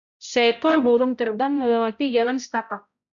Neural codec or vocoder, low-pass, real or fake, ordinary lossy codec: codec, 16 kHz, 0.5 kbps, X-Codec, HuBERT features, trained on balanced general audio; 7.2 kHz; fake; MP3, 96 kbps